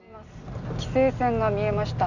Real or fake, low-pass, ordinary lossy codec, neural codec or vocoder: real; 7.2 kHz; none; none